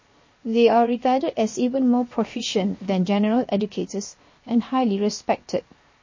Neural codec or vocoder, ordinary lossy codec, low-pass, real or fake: codec, 16 kHz, 0.7 kbps, FocalCodec; MP3, 32 kbps; 7.2 kHz; fake